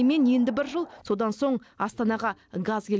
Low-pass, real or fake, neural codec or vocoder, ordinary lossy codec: none; real; none; none